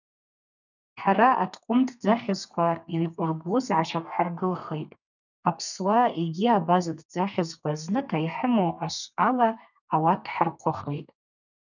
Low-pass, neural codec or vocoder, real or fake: 7.2 kHz; codec, 32 kHz, 1.9 kbps, SNAC; fake